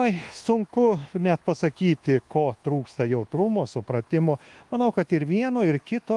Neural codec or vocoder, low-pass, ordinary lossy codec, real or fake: codec, 24 kHz, 1.2 kbps, DualCodec; 10.8 kHz; Opus, 24 kbps; fake